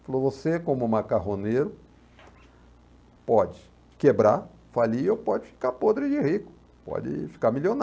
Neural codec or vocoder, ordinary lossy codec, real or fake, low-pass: none; none; real; none